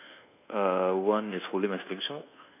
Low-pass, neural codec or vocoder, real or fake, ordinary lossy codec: 3.6 kHz; codec, 24 kHz, 1.2 kbps, DualCodec; fake; none